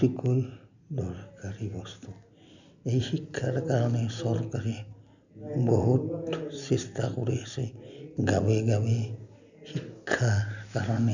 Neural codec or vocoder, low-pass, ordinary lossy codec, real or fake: none; 7.2 kHz; none; real